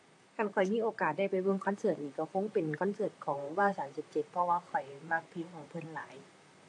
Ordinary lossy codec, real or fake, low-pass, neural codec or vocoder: AAC, 48 kbps; fake; 10.8 kHz; vocoder, 44.1 kHz, 128 mel bands, Pupu-Vocoder